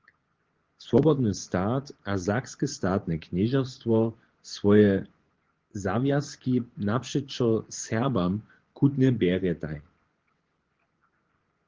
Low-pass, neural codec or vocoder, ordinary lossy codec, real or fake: 7.2 kHz; vocoder, 24 kHz, 100 mel bands, Vocos; Opus, 16 kbps; fake